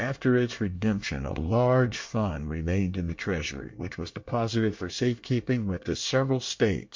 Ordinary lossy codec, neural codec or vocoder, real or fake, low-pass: MP3, 48 kbps; codec, 24 kHz, 1 kbps, SNAC; fake; 7.2 kHz